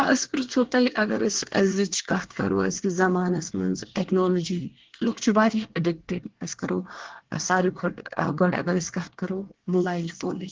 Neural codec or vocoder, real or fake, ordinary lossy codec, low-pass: codec, 24 kHz, 1 kbps, SNAC; fake; Opus, 16 kbps; 7.2 kHz